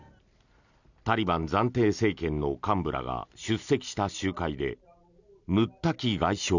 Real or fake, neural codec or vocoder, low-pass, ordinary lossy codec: real; none; 7.2 kHz; none